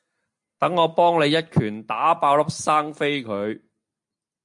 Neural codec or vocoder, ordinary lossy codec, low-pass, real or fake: none; MP3, 64 kbps; 10.8 kHz; real